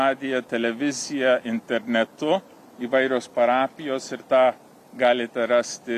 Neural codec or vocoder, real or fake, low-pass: none; real; 14.4 kHz